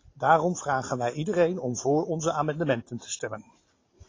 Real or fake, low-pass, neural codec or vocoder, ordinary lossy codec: real; 7.2 kHz; none; AAC, 32 kbps